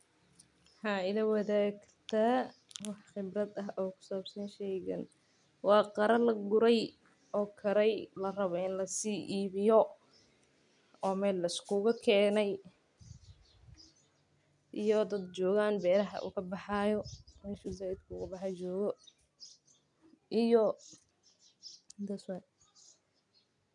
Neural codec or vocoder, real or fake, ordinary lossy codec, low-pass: none; real; none; 10.8 kHz